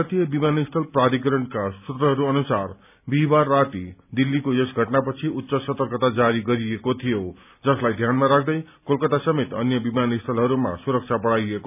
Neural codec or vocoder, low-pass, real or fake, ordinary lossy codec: none; 3.6 kHz; real; none